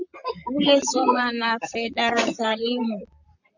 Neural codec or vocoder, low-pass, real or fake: vocoder, 44.1 kHz, 128 mel bands, Pupu-Vocoder; 7.2 kHz; fake